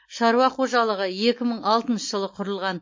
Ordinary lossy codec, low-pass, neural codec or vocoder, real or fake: MP3, 32 kbps; 7.2 kHz; none; real